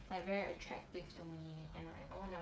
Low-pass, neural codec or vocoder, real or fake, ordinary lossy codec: none; codec, 16 kHz, 8 kbps, FreqCodec, smaller model; fake; none